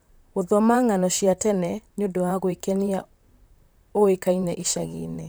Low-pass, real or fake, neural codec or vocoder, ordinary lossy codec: none; fake; vocoder, 44.1 kHz, 128 mel bands, Pupu-Vocoder; none